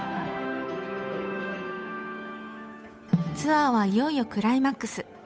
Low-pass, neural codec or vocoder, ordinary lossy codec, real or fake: none; codec, 16 kHz, 8 kbps, FunCodec, trained on Chinese and English, 25 frames a second; none; fake